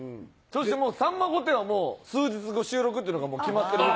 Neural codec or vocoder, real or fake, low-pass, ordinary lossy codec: none; real; none; none